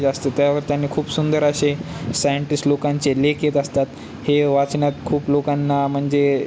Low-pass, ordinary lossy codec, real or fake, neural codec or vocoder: none; none; real; none